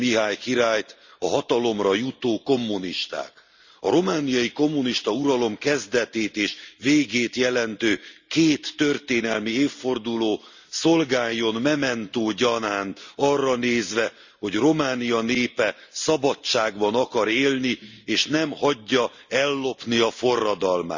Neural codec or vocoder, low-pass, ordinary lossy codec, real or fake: none; 7.2 kHz; Opus, 64 kbps; real